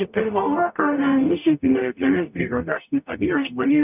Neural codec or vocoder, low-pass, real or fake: codec, 44.1 kHz, 0.9 kbps, DAC; 3.6 kHz; fake